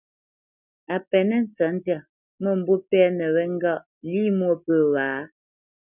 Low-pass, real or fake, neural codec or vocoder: 3.6 kHz; real; none